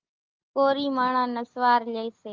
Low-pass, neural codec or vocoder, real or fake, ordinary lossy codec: 7.2 kHz; none; real; Opus, 32 kbps